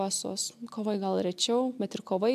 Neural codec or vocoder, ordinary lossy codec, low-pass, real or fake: none; AAC, 96 kbps; 14.4 kHz; real